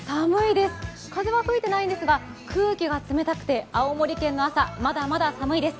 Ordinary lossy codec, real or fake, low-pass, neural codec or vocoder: none; real; none; none